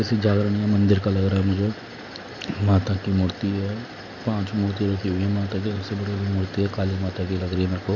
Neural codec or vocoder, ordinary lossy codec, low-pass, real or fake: none; none; 7.2 kHz; real